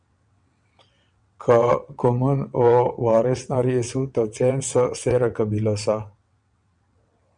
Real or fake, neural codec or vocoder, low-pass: fake; vocoder, 22.05 kHz, 80 mel bands, WaveNeXt; 9.9 kHz